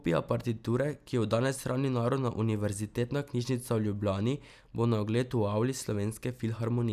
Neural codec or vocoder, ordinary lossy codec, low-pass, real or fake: none; none; 14.4 kHz; real